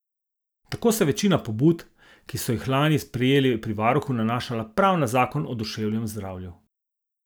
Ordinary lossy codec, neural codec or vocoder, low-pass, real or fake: none; none; none; real